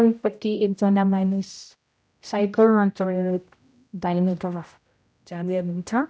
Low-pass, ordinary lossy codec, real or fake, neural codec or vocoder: none; none; fake; codec, 16 kHz, 0.5 kbps, X-Codec, HuBERT features, trained on general audio